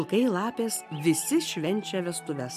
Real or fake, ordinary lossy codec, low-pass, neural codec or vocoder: real; AAC, 96 kbps; 14.4 kHz; none